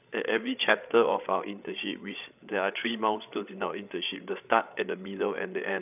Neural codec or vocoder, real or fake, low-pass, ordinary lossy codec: codec, 16 kHz, 8 kbps, FreqCodec, larger model; fake; 3.6 kHz; none